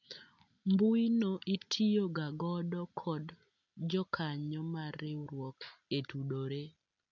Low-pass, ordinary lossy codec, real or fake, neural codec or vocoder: 7.2 kHz; none; real; none